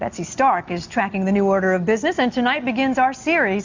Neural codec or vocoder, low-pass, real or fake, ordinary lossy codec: none; 7.2 kHz; real; MP3, 64 kbps